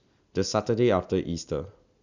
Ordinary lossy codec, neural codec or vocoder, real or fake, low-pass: none; none; real; 7.2 kHz